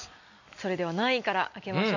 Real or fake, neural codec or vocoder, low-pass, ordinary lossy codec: real; none; 7.2 kHz; none